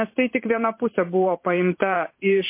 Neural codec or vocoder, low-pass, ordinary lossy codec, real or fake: vocoder, 44.1 kHz, 128 mel bands every 512 samples, BigVGAN v2; 3.6 kHz; MP3, 24 kbps; fake